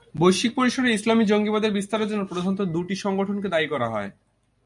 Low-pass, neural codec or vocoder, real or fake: 10.8 kHz; none; real